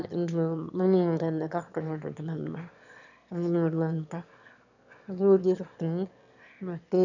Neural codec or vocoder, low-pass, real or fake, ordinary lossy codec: autoencoder, 22.05 kHz, a latent of 192 numbers a frame, VITS, trained on one speaker; 7.2 kHz; fake; none